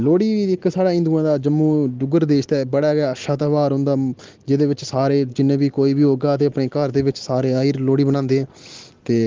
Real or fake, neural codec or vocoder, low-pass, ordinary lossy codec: real; none; 7.2 kHz; Opus, 16 kbps